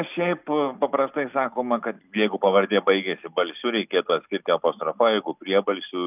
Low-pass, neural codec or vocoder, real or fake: 3.6 kHz; none; real